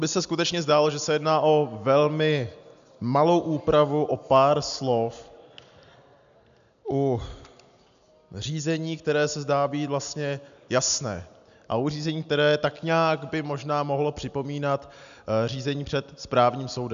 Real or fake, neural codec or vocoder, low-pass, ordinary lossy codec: real; none; 7.2 kHz; AAC, 96 kbps